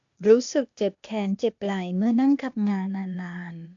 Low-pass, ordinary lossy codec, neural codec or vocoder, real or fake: 7.2 kHz; AAC, 64 kbps; codec, 16 kHz, 0.8 kbps, ZipCodec; fake